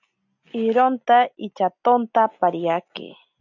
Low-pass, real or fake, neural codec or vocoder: 7.2 kHz; real; none